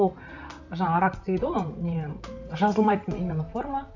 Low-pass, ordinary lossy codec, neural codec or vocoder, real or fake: 7.2 kHz; none; vocoder, 44.1 kHz, 128 mel bands, Pupu-Vocoder; fake